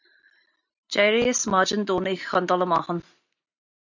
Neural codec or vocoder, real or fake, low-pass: none; real; 7.2 kHz